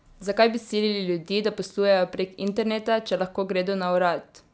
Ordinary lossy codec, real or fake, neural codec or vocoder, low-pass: none; real; none; none